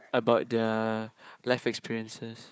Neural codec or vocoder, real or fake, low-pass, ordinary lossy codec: codec, 16 kHz, 4 kbps, FunCodec, trained on Chinese and English, 50 frames a second; fake; none; none